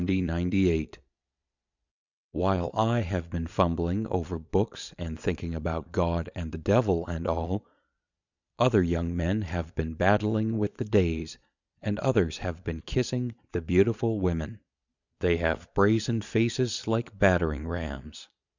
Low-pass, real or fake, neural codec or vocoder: 7.2 kHz; fake; vocoder, 22.05 kHz, 80 mel bands, Vocos